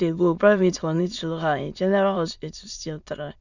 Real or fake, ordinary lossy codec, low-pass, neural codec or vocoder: fake; none; 7.2 kHz; autoencoder, 22.05 kHz, a latent of 192 numbers a frame, VITS, trained on many speakers